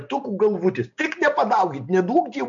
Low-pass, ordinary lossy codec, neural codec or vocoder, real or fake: 7.2 kHz; MP3, 48 kbps; none; real